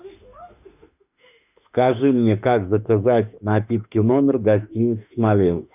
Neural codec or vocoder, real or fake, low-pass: autoencoder, 48 kHz, 32 numbers a frame, DAC-VAE, trained on Japanese speech; fake; 3.6 kHz